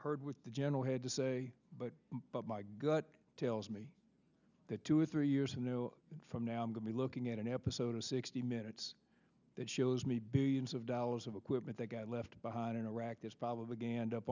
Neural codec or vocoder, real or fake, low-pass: none; real; 7.2 kHz